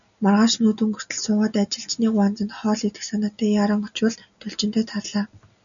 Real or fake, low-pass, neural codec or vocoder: real; 7.2 kHz; none